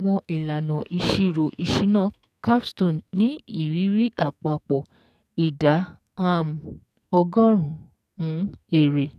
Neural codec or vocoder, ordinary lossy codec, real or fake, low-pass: codec, 44.1 kHz, 2.6 kbps, SNAC; none; fake; 14.4 kHz